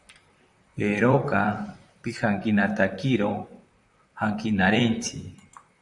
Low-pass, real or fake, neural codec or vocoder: 10.8 kHz; fake; vocoder, 44.1 kHz, 128 mel bands, Pupu-Vocoder